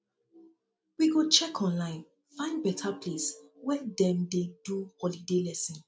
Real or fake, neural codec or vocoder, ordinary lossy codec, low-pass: real; none; none; none